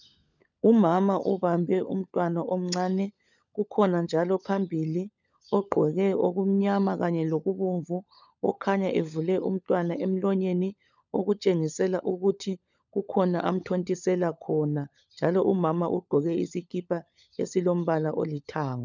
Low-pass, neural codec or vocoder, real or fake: 7.2 kHz; codec, 16 kHz, 16 kbps, FunCodec, trained on LibriTTS, 50 frames a second; fake